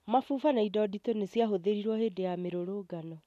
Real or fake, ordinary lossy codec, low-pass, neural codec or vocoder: real; none; 14.4 kHz; none